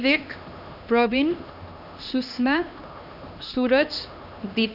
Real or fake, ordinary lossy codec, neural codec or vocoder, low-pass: fake; none; codec, 16 kHz, 2 kbps, X-Codec, HuBERT features, trained on LibriSpeech; 5.4 kHz